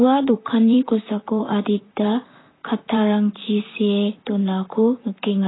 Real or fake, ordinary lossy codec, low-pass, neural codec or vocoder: fake; AAC, 16 kbps; 7.2 kHz; vocoder, 44.1 kHz, 128 mel bands, Pupu-Vocoder